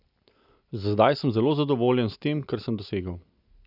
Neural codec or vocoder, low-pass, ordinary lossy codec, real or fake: none; 5.4 kHz; none; real